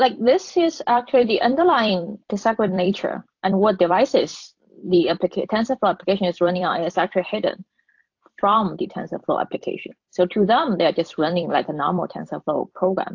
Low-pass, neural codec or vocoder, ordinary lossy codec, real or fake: 7.2 kHz; vocoder, 44.1 kHz, 128 mel bands every 256 samples, BigVGAN v2; AAC, 48 kbps; fake